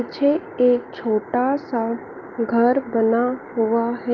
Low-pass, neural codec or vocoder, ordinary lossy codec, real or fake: 7.2 kHz; none; Opus, 24 kbps; real